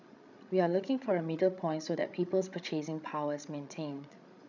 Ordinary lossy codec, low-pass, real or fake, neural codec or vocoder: none; 7.2 kHz; fake; codec, 16 kHz, 16 kbps, FreqCodec, larger model